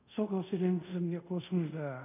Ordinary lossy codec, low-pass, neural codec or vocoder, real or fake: none; 3.6 kHz; codec, 24 kHz, 0.5 kbps, DualCodec; fake